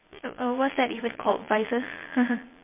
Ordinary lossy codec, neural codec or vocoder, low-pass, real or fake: MP3, 24 kbps; vocoder, 22.05 kHz, 80 mel bands, Vocos; 3.6 kHz; fake